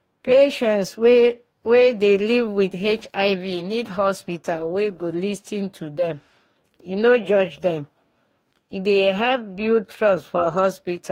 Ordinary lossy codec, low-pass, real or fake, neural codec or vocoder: AAC, 48 kbps; 19.8 kHz; fake; codec, 44.1 kHz, 2.6 kbps, DAC